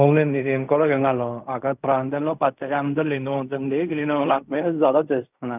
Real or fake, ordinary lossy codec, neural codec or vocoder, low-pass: fake; none; codec, 16 kHz in and 24 kHz out, 0.4 kbps, LongCat-Audio-Codec, fine tuned four codebook decoder; 3.6 kHz